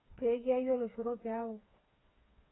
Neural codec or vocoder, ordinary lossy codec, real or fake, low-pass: codec, 16 kHz, 4 kbps, FreqCodec, smaller model; AAC, 16 kbps; fake; 7.2 kHz